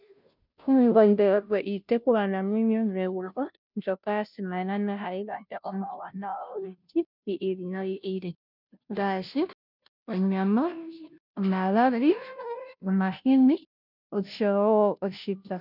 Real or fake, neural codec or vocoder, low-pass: fake; codec, 16 kHz, 0.5 kbps, FunCodec, trained on Chinese and English, 25 frames a second; 5.4 kHz